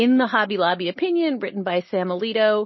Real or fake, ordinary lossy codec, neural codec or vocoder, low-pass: real; MP3, 24 kbps; none; 7.2 kHz